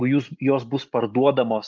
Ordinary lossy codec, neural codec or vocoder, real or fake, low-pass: Opus, 24 kbps; none; real; 7.2 kHz